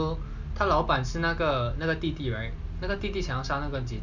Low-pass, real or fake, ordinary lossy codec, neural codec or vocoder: 7.2 kHz; real; none; none